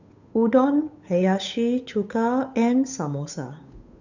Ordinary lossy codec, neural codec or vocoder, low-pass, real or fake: none; codec, 16 kHz, 8 kbps, FunCodec, trained on Chinese and English, 25 frames a second; 7.2 kHz; fake